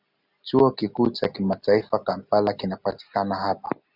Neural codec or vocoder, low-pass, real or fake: none; 5.4 kHz; real